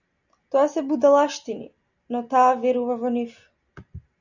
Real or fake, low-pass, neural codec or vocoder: real; 7.2 kHz; none